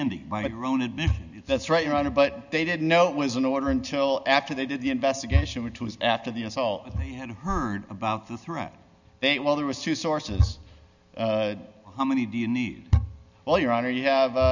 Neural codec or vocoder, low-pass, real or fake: none; 7.2 kHz; real